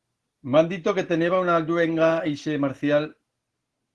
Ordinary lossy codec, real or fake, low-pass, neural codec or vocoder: Opus, 16 kbps; real; 10.8 kHz; none